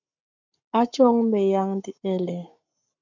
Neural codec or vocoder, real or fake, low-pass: codec, 44.1 kHz, 7.8 kbps, Pupu-Codec; fake; 7.2 kHz